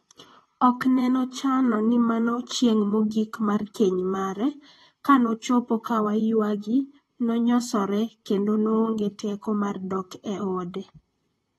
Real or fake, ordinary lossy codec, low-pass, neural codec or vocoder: fake; AAC, 32 kbps; 19.8 kHz; vocoder, 44.1 kHz, 128 mel bands, Pupu-Vocoder